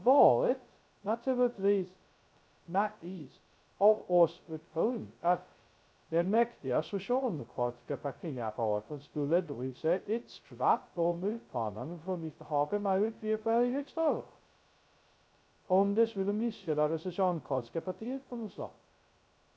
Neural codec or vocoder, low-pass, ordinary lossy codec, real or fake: codec, 16 kHz, 0.2 kbps, FocalCodec; none; none; fake